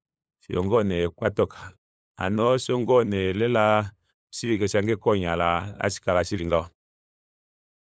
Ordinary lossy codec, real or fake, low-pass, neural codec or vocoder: none; fake; none; codec, 16 kHz, 8 kbps, FunCodec, trained on LibriTTS, 25 frames a second